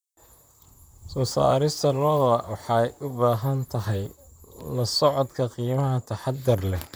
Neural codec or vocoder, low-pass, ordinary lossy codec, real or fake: vocoder, 44.1 kHz, 128 mel bands, Pupu-Vocoder; none; none; fake